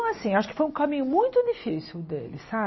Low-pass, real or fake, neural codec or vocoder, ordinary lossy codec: 7.2 kHz; real; none; MP3, 24 kbps